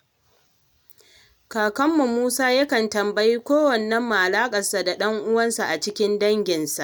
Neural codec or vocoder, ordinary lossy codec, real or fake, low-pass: none; none; real; none